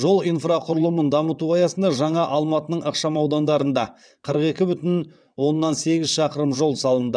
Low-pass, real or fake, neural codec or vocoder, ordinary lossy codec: 9.9 kHz; fake; vocoder, 22.05 kHz, 80 mel bands, WaveNeXt; none